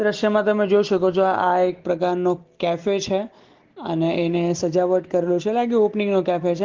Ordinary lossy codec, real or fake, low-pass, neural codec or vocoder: Opus, 16 kbps; real; 7.2 kHz; none